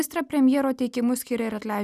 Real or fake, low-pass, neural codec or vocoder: real; 14.4 kHz; none